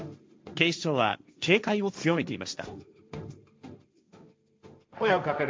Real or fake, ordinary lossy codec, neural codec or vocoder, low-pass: fake; none; codec, 16 kHz, 1.1 kbps, Voila-Tokenizer; none